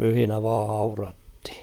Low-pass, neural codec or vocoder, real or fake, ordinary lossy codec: 19.8 kHz; vocoder, 44.1 kHz, 128 mel bands, Pupu-Vocoder; fake; Opus, 32 kbps